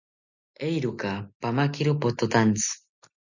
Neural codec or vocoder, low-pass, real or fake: none; 7.2 kHz; real